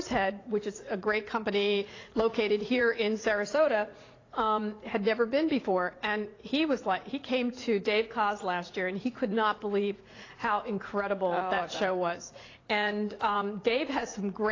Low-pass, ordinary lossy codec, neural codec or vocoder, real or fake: 7.2 kHz; AAC, 32 kbps; vocoder, 44.1 kHz, 80 mel bands, Vocos; fake